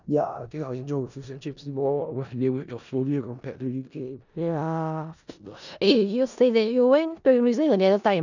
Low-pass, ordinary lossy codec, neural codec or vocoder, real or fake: 7.2 kHz; none; codec, 16 kHz in and 24 kHz out, 0.4 kbps, LongCat-Audio-Codec, four codebook decoder; fake